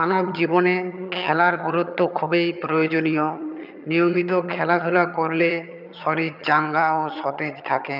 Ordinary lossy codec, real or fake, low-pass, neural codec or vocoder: none; fake; 5.4 kHz; vocoder, 22.05 kHz, 80 mel bands, HiFi-GAN